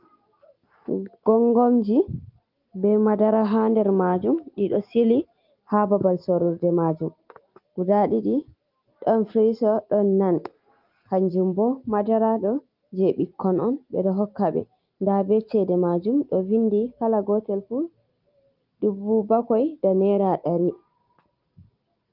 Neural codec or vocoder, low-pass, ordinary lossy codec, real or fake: none; 5.4 kHz; Opus, 32 kbps; real